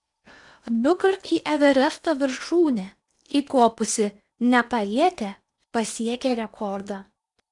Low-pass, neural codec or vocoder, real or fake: 10.8 kHz; codec, 16 kHz in and 24 kHz out, 0.8 kbps, FocalCodec, streaming, 65536 codes; fake